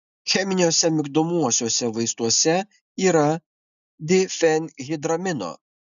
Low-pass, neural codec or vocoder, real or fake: 7.2 kHz; none; real